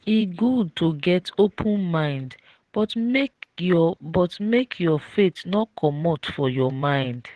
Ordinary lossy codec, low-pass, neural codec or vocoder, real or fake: Opus, 16 kbps; 10.8 kHz; vocoder, 24 kHz, 100 mel bands, Vocos; fake